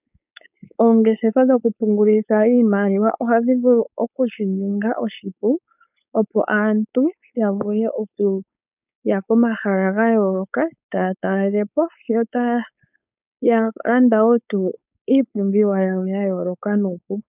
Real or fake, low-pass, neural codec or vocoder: fake; 3.6 kHz; codec, 16 kHz, 4.8 kbps, FACodec